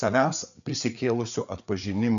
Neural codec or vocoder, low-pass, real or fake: codec, 16 kHz, 4 kbps, FreqCodec, larger model; 7.2 kHz; fake